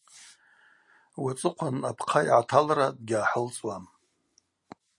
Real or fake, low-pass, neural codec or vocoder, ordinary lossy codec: real; 10.8 kHz; none; MP3, 48 kbps